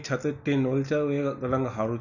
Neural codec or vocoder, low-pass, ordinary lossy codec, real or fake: none; 7.2 kHz; none; real